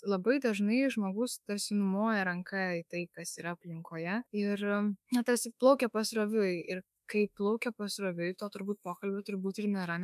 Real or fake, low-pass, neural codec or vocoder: fake; 14.4 kHz; autoencoder, 48 kHz, 32 numbers a frame, DAC-VAE, trained on Japanese speech